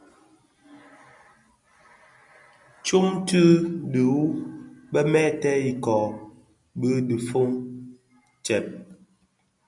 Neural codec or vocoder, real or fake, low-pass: none; real; 10.8 kHz